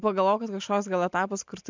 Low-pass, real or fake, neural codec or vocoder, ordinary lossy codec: 7.2 kHz; real; none; MP3, 64 kbps